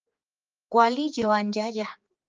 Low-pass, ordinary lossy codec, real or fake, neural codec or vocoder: 7.2 kHz; Opus, 24 kbps; fake; codec, 16 kHz, 4 kbps, X-Codec, HuBERT features, trained on general audio